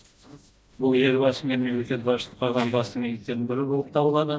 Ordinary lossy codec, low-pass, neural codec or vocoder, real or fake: none; none; codec, 16 kHz, 1 kbps, FreqCodec, smaller model; fake